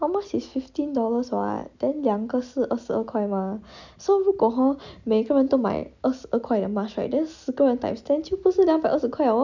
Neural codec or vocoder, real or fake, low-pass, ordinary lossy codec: none; real; 7.2 kHz; none